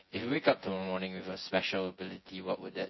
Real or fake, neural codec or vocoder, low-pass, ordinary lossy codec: fake; vocoder, 24 kHz, 100 mel bands, Vocos; 7.2 kHz; MP3, 24 kbps